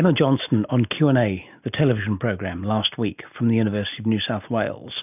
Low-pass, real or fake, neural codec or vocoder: 3.6 kHz; real; none